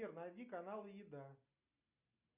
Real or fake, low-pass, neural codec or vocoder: real; 3.6 kHz; none